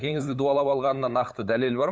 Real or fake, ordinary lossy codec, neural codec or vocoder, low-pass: fake; none; codec, 16 kHz, 8 kbps, FunCodec, trained on LibriTTS, 25 frames a second; none